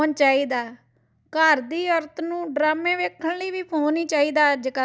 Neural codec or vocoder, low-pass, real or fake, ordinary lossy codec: none; none; real; none